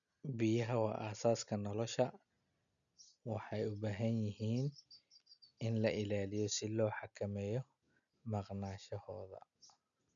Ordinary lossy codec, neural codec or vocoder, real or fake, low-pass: none; none; real; 7.2 kHz